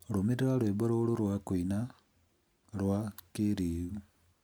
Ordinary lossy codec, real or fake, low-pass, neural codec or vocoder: none; real; none; none